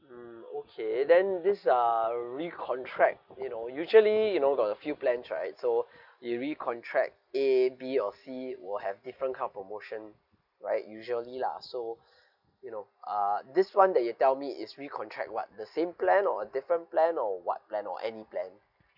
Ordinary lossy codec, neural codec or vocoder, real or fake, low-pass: none; none; real; 5.4 kHz